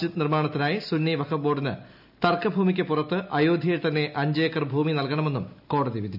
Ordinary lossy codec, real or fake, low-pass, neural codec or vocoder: none; real; 5.4 kHz; none